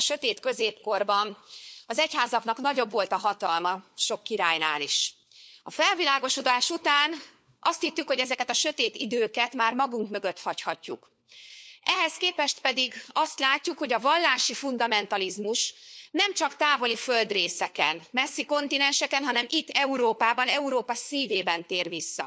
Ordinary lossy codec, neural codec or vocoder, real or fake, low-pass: none; codec, 16 kHz, 4 kbps, FunCodec, trained on LibriTTS, 50 frames a second; fake; none